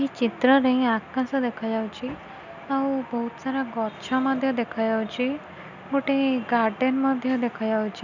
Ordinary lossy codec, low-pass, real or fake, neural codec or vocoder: none; 7.2 kHz; real; none